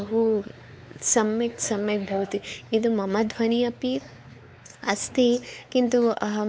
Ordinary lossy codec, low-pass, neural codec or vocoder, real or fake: none; none; codec, 16 kHz, 4 kbps, X-Codec, WavLM features, trained on Multilingual LibriSpeech; fake